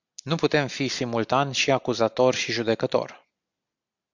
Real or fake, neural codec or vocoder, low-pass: real; none; 7.2 kHz